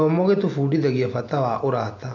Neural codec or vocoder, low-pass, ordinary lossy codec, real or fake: none; 7.2 kHz; AAC, 48 kbps; real